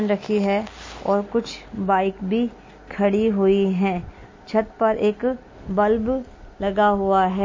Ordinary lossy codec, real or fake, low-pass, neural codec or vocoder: MP3, 32 kbps; real; 7.2 kHz; none